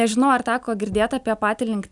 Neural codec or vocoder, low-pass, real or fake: none; 10.8 kHz; real